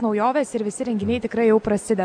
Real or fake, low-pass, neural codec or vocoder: real; 9.9 kHz; none